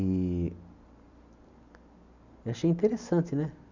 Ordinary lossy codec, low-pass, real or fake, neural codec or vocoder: none; 7.2 kHz; real; none